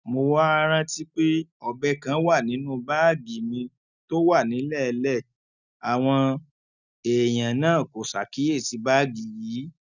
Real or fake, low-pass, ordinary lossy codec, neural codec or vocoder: real; 7.2 kHz; none; none